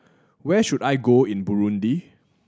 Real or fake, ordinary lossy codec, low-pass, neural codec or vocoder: real; none; none; none